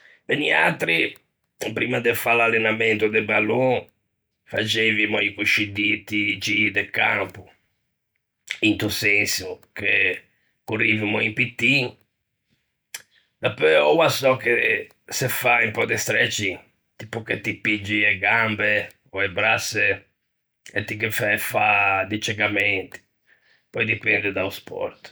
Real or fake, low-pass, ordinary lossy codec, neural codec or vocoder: real; none; none; none